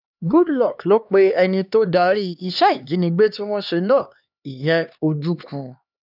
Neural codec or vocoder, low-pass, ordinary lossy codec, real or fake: codec, 16 kHz, 2 kbps, X-Codec, HuBERT features, trained on LibriSpeech; 5.4 kHz; none; fake